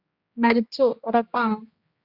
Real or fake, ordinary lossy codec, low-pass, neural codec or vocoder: fake; Opus, 64 kbps; 5.4 kHz; codec, 16 kHz, 1 kbps, X-Codec, HuBERT features, trained on general audio